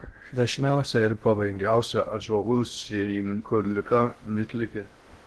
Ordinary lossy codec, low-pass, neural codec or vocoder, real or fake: Opus, 16 kbps; 10.8 kHz; codec, 16 kHz in and 24 kHz out, 0.6 kbps, FocalCodec, streaming, 2048 codes; fake